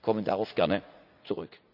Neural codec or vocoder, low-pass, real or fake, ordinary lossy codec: vocoder, 44.1 kHz, 80 mel bands, Vocos; 5.4 kHz; fake; none